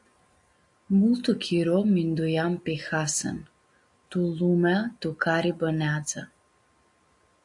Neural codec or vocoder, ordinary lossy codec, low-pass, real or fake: none; AAC, 64 kbps; 10.8 kHz; real